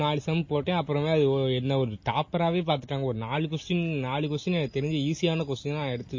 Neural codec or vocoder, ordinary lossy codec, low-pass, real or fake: none; MP3, 32 kbps; 7.2 kHz; real